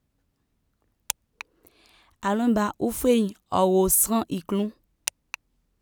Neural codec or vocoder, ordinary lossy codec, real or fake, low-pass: none; none; real; none